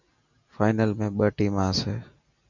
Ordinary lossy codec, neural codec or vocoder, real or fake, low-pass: MP3, 64 kbps; none; real; 7.2 kHz